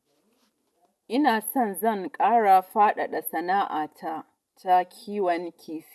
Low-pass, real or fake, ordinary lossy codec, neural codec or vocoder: none; real; none; none